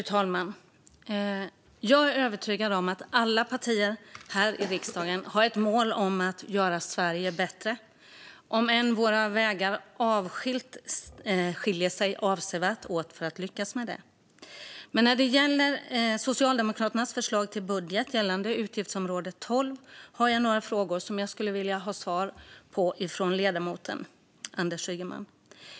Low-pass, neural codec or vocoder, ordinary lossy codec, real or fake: none; none; none; real